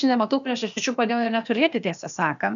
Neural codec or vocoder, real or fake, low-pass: codec, 16 kHz, 0.8 kbps, ZipCodec; fake; 7.2 kHz